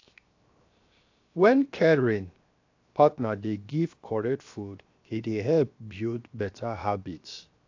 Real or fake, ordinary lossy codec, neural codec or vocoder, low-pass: fake; AAC, 48 kbps; codec, 16 kHz, 0.7 kbps, FocalCodec; 7.2 kHz